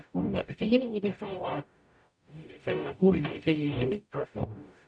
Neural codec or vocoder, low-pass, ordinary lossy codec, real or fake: codec, 44.1 kHz, 0.9 kbps, DAC; 9.9 kHz; none; fake